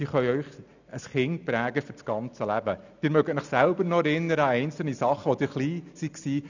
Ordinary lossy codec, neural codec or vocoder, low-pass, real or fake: none; none; 7.2 kHz; real